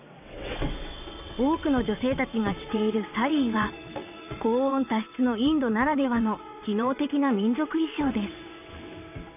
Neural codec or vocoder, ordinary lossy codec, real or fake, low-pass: vocoder, 22.05 kHz, 80 mel bands, WaveNeXt; none; fake; 3.6 kHz